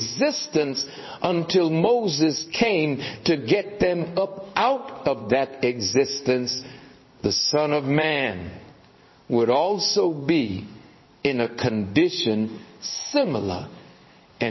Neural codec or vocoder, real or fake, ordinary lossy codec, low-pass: codec, 16 kHz in and 24 kHz out, 1 kbps, XY-Tokenizer; fake; MP3, 24 kbps; 7.2 kHz